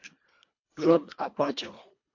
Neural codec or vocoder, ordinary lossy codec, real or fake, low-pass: codec, 24 kHz, 1.5 kbps, HILCodec; MP3, 48 kbps; fake; 7.2 kHz